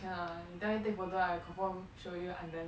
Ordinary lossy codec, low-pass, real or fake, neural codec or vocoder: none; none; real; none